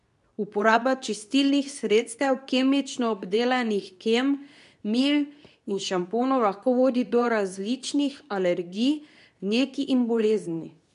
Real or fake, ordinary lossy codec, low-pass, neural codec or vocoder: fake; none; 10.8 kHz; codec, 24 kHz, 0.9 kbps, WavTokenizer, medium speech release version 2